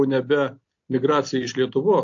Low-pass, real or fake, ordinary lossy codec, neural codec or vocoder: 7.2 kHz; real; AAC, 48 kbps; none